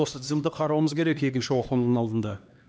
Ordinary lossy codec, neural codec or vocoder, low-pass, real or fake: none; codec, 16 kHz, 2 kbps, X-Codec, HuBERT features, trained on LibriSpeech; none; fake